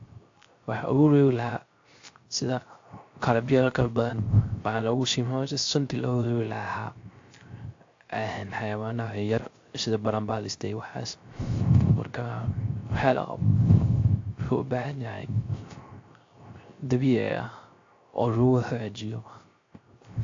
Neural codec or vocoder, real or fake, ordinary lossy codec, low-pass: codec, 16 kHz, 0.3 kbps, FocalCodec; fake; AAC, 48 kbps; 7.2 kHz